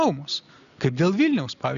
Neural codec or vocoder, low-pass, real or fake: none; 7.2 kHz; real